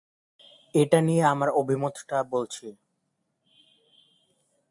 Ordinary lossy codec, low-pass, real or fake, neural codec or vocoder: AAC, 64 kbps; 10.8 kHz; real; none